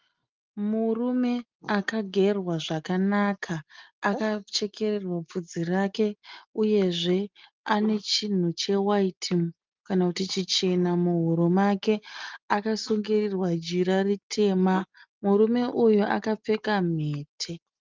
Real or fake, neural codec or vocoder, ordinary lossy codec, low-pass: real; none; Opus, 32 kbps; 7.2 kHz